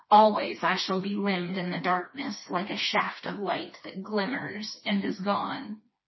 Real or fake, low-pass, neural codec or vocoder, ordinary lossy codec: fake; 7.2 kHz; codec, 16 kHz, 2 kbps, FreqCodec, smaller model; MP3, 24 kbps